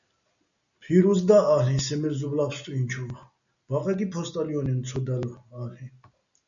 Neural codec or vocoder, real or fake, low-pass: none; real; 7.2 kHz